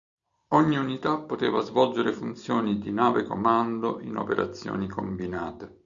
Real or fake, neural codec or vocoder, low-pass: real; none; 7.2 kHz